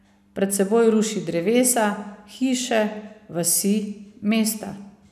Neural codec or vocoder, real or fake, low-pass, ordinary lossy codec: none; real; 14.4 kHz; none